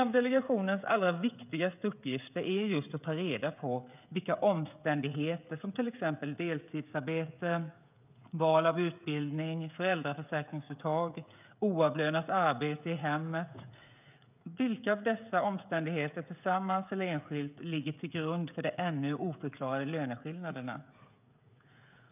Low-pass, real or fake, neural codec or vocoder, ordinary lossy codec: 3.6 kHz; fake; codec, 16 kHz, 16 kbps, FreqCodec, smaller model; none